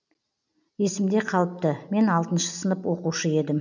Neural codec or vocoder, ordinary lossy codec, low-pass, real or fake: none; none; 7.2 kHz; real